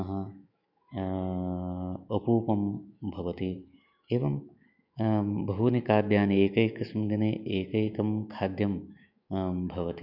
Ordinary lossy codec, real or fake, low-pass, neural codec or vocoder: none; fake; 5.4 kHz; autoencoder, 48 kHz, 128 numbers a frame, DAC-VAE, trained on Japanese speech